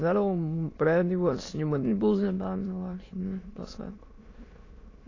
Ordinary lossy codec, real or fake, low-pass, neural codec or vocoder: AAC, 32 kbps; fake; 7.2 kHz; autoencoder, 22.05 kHz, a latent of 192 numbers a frame, VITS, trained on many speakers